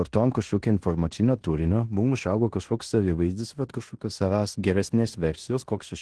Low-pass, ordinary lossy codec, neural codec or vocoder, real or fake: 10.8 kHz; Opus, 16 kbps; codec, 16 kHz in and 24 kHz out, 0.9 kbps, LongCat-Audio-Codec, fine tuned four codebook decoder; fake